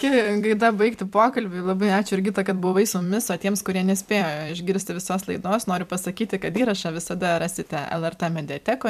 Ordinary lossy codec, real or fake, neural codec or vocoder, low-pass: MP3, 96 kbps; fake; vocoder, 44.1 kHz, 128 mel bands every 256 samples, BigVGAN v2; 14.4 kHz